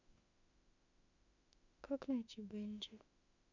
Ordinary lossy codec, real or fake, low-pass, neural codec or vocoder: none; fake; 7.2 kHz; autoencoder, 48 kHz, 32 numbers a frame, DAC-VAE, trained on Japanese speech